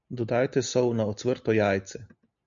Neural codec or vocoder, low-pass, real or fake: none; 7.2 kHz; real